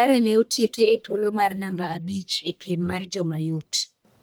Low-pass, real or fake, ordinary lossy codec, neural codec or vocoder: none; fake; none; codec, 44.1 kHz, 1.7 kbps, Pupu-Codec